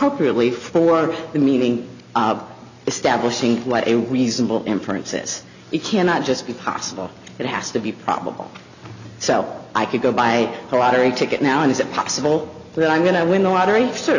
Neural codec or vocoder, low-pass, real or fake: none; 7.2 kHz; real